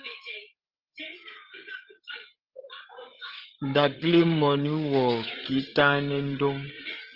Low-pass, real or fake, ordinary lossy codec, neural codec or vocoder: 5.4 kHz; fake; Opus, 16 kbps; codec, 16 kHz, 8 kbps, FreqCodec, larger model